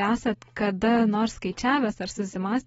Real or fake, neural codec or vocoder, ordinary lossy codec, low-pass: real; none; AAC, 24 kbps; 19.8 kHz